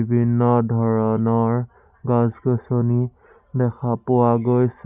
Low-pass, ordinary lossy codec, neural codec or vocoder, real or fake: 3.6 kHz; Opus, 64 kbps; none; real